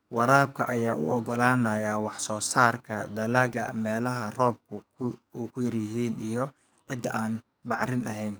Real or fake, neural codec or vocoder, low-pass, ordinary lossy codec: fake; codec, 44.1 kHz, 2.6 kbps, SNAC; none; none